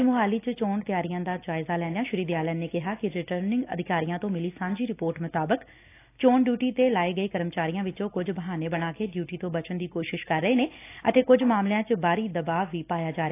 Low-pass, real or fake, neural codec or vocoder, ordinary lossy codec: 3.6 kHz; real; none; AAC, 24 kbps